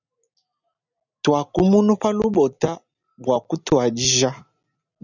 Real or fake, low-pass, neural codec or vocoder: real; 7.2 kHz; none